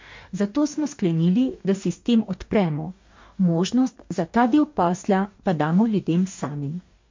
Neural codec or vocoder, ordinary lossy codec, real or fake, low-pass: codec, 44.1 kHz, 2.6 kbps, DAC; MP3, 48 kbps; fake; 7.2 kHz